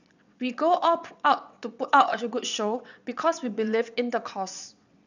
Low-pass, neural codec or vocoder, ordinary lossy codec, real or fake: 7.2 kHz; vocoder, 22.05 kHz, 80 mel bands, WaveNeXt; none; fake